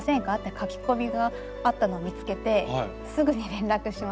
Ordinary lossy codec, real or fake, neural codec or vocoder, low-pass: none; real; none; none